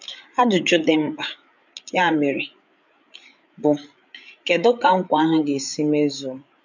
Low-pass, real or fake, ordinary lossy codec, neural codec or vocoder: 7.2 kHz; fake; none; codec, 16 kHz, 8 kbps, FreqCodec, larger model